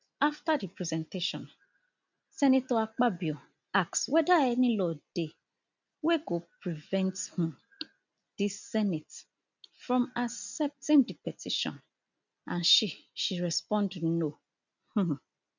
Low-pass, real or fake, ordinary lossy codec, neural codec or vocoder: 7.2 kHz; real; none; none